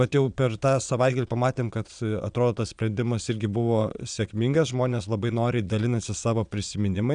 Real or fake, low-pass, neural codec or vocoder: fake; 9.9 kHz; vocoder, 22.05 kHz, 80 mel bands, Vocos